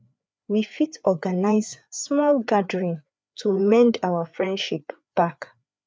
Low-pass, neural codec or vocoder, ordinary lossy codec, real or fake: none; codec, 16 kHz, 4 kbps, FreqCodec, larger model; none; fake